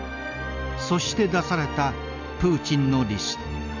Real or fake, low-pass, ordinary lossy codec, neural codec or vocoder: real; 7.2 kHz; none; none